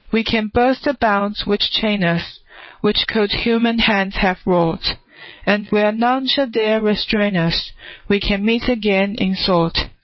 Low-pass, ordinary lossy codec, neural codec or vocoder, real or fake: 7.2 kHz; MP3, 24 kbps; vocoder, 22.05 kHz, 80 mel bands, WaveNeXt; fake